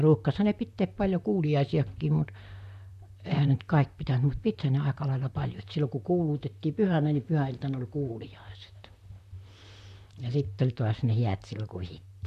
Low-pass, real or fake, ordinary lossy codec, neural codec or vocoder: 14.4 kHz; fake; none; vocoder, 44.1 kHz, 128 mel bands, Pupu-Vocoder